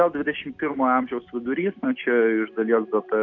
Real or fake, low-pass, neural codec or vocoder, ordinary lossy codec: real; 7.2 kHz; none; AAC, 48 kbps